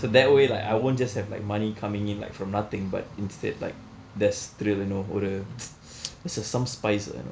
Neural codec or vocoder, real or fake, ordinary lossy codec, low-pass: none; real; none; none